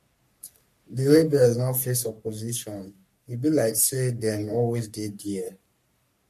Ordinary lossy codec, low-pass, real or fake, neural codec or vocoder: MP3, 64 kbps; 14.4 kHz; fake; codec, 44.1 kHz, 3.4 kbps, Pupu-Codec